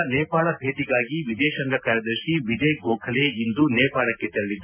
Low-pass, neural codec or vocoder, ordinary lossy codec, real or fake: 3.6 kHz; none; none; real